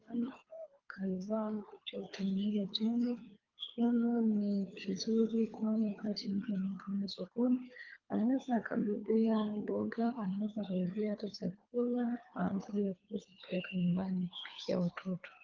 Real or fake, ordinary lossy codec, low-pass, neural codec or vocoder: fake; Opus, 24 kbps; 7.2 kHz; codec, 24 kHz, 3 kbps, HILCodec